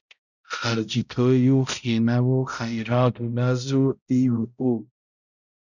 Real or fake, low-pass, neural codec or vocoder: fake; 7.2 kHz; codec, 16 kHz, 0.5 kbps, X-Codec, HuBERT features, trained on balanced general audio